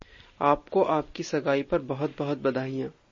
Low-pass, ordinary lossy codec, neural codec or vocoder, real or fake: 7.2 kHz; MP3, 32 kbps; none; real